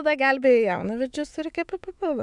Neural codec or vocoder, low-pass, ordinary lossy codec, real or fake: autoencoder, 48 kHz, 128 numbers a frame, DAC-VAE, trained on Japanese speech; 10.8 kHz; MP3, 96 kbps; fake